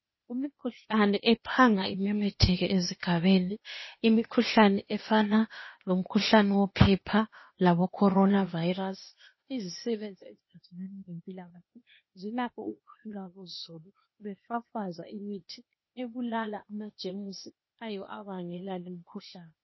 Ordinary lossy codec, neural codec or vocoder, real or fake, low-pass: MP3, 24 kbps; codec, 16 kHz, 0.8 kbps, ZipCodec; fake; 7.2 kHz